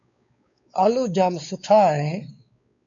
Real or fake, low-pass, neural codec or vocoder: fake; 7.2 kHz; codec, 16 kHz, 4 kbps, X-Codec, WavLM features, trained on Multilingual LibriSpeech